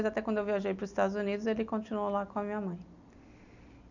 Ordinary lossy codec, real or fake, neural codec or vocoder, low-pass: none; real; none; 7.2 kHz